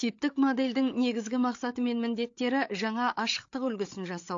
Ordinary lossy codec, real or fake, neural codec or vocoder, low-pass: AAC, 48 kbps; fake; codec, 16 kHz, 8 kbps, FreqCodec, larger model; 7.2 kHz